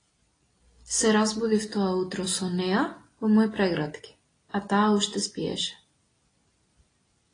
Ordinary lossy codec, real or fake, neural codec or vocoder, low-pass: AAC, 32 kbps; real; none; 9.9 kHz